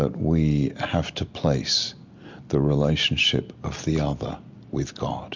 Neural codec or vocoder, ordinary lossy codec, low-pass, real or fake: none; MP3, 64 kbps; 7.2 kHz; real